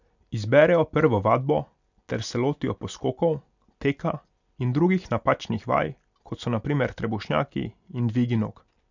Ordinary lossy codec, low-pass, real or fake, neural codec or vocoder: none; 7.2 kHz; real; none